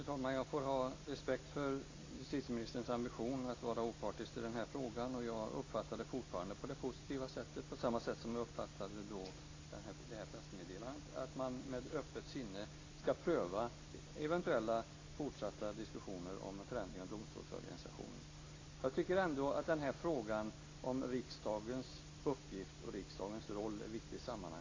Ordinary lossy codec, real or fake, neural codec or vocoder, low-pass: AAC, 32 kbps; real; none; 7.2 kHz